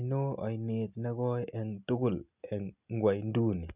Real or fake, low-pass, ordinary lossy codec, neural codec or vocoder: real; 3.6 kHz; none; none